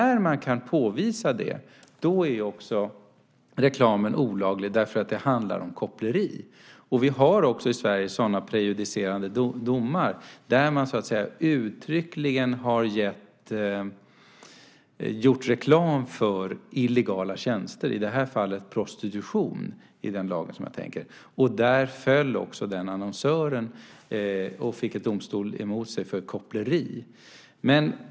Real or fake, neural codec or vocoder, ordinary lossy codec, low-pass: real; none; none; none